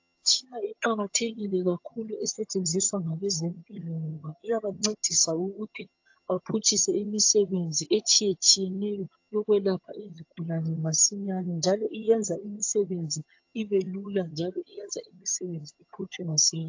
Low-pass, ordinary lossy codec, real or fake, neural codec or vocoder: 7.2 kHz; AAC, 48 kbps; fake; vocoder, 22.05 kHz, 80 mel bands, HiFi-GAN